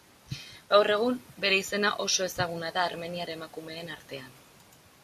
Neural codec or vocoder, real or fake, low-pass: none; real; 14.4 kHz